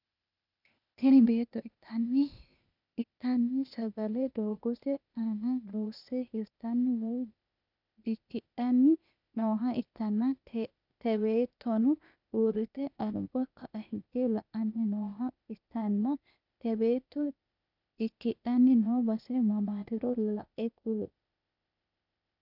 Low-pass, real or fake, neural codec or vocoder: 5.4 kHz; fake; codec, 16 kHz, 0.8 kbps, ZipCodec